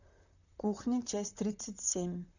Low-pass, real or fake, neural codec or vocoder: 7.2 kHz; fake; vocoder, 44.1 kHz, 128 mel bands, Pupu-Vocoder